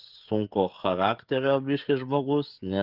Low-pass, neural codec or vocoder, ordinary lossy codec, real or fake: 5.4 kHz; codec, 16 kHz, 16 kbps, FreqCodec, smaller model; Opus, 24 kbps; fake